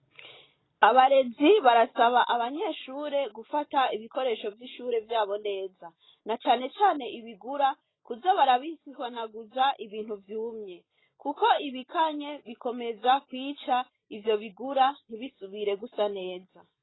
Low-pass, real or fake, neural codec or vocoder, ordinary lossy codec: 7.2 kHz; real; none; AAC, 16 kbps